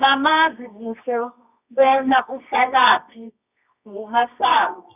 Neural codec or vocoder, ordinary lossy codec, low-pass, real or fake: codec, 24 kHz, 0.9 kbps, WavTokenizer, medium music audio release; none; 3.6 kHz; fake